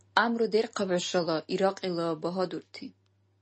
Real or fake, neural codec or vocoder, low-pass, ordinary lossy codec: real; none; 10.8 kHz; MP3, 32 kbps